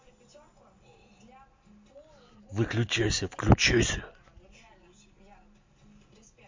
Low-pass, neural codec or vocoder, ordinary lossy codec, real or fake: 7.2 kHz; none; MP3, 48 kbps; real